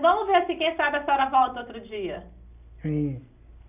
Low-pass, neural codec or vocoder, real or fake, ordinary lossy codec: 3.6 kHz; none; real; none